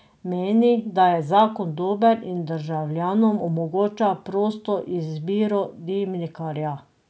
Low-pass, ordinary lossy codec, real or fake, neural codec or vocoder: none; none; real; none